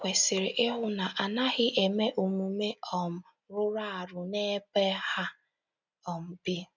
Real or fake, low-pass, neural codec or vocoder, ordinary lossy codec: real; 7.2 kHz; none; none